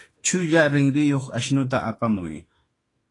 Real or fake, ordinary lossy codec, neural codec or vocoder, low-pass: fake; AAC, 32 kbps; autoencoder, 48 kHz, 32 numbers a frame, DAC-VAE, trained on Japanese speech; 10.8 kHz